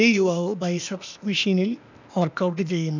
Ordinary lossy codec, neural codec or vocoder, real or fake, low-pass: none; codec, 16 kHz, 0.8 kbps, ZipCodec; fake; 7.2 kHz